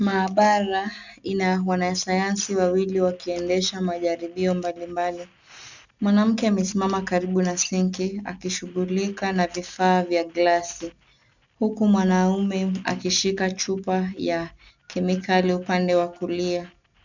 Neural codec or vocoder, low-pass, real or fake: none; 7.2 kHz; real